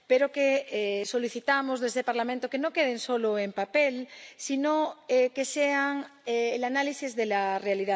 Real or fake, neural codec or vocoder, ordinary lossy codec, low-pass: real; none; none; none